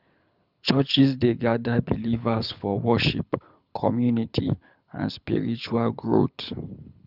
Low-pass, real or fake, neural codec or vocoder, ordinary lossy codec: 5.4 kHz; fake; codec, 24 kHz, 6 kbps, HILCodec; none